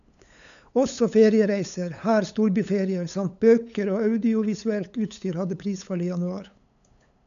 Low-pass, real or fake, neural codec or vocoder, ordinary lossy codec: 7.2 kHz; fake; codec, 16 kHz, 8 kbps, FunCodec, trained on LibriTTS, 25 frames a second; none